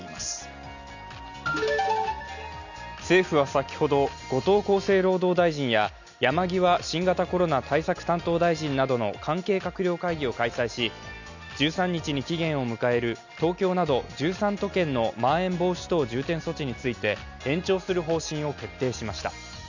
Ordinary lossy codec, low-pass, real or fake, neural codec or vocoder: none; 7.2 kHz; real; none